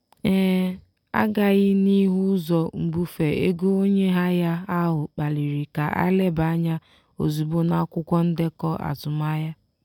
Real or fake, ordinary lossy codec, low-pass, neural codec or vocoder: real; none; 19.8 kHz; none